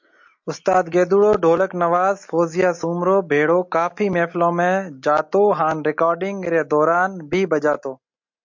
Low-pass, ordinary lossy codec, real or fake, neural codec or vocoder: 7.2 kHz; MP3, 48 kbps; real; none